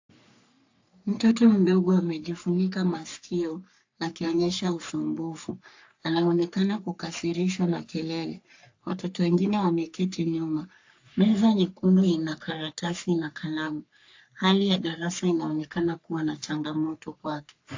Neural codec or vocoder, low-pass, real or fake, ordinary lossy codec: codec, 44.1 kHz, 3.4 kbps, Pupu-Codec; 7.2 kHz; fake; AAC, 48 kbps